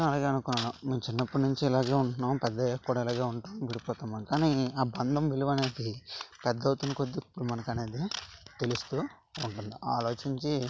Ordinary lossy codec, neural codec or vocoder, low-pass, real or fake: none; none; none; real